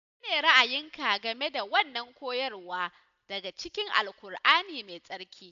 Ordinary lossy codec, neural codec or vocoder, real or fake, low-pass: AAC, 96 kbps; none; real; 7.2 kHz